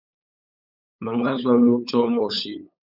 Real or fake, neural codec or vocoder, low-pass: fake; codec, 16 kHz, 8 kbps, FunCodec, trained on LibriTTS, 25 frames a second; 5.4 kHz